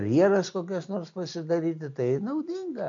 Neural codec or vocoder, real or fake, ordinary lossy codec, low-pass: none; real; MP3, 64 kbps; 7.2 kHz